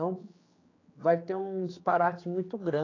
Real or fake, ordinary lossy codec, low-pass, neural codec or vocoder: fake; AAC, 32 kbps; 7.2 kHz; codec, 16 kHz, 4 kbps, X-Codec, HuBERT features, trained on general audio